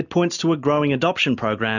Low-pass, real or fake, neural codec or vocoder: 7.2 kHz; real; none